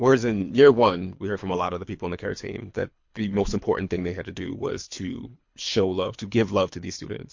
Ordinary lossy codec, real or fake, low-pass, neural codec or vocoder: MP3, 48 kbps; fake; 7.2 kHz; codec, 24 kHz, 3 kbps, HILCodec